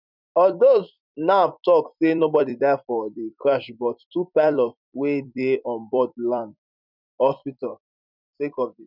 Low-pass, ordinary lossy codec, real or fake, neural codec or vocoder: 5.4 kHz; none; fake; vocoder, 44.1 kHz, 128 mel bands every 256 samples, BigVGAN v2